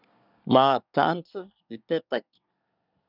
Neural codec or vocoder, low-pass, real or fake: codec, 24 kHz, 1 kbps, SNAC; 5.4 kHz; fake